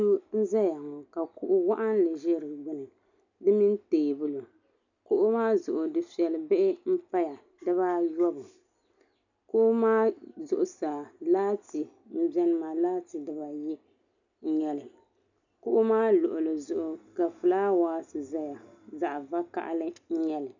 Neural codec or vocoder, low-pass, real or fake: none; 7.2 kHz; real